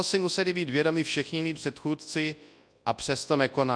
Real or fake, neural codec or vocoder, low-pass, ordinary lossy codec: fake; codec, 24 kHz, 0.9 kbps, WavTokenizer, large speech release; 9.9 kHz; AAC, 64 kbps